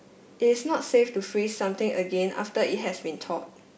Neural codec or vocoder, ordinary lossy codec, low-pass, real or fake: none; none; none; real